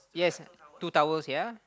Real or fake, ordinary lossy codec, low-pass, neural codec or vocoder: real; none; none; none